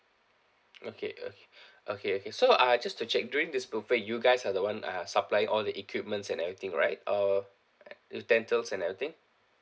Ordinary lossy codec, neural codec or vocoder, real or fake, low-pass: none; none; real; none